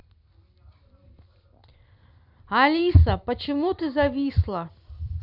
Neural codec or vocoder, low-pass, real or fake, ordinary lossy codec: none; 5.4 kHz; real; none